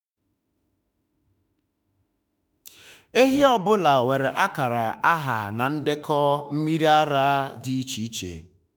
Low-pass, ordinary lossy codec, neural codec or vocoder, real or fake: none; none; autoencoder, 48 kHz, 32 numbers a frame, DAC-VAE, trained on Japanese speech; fake